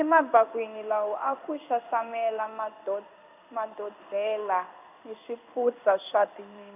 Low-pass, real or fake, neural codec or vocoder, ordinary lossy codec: 3.6 kHz; fake; codec, 16 kHz in and 24 kHz out, 1 kbps, XY-Tokenizer; AAC, 32 kbps